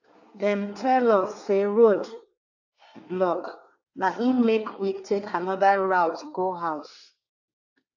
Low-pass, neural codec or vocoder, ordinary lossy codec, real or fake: 7.2 kHz; codec, 24 kHz, 1 kbps, SNAC; AAC, 48 kbps; fake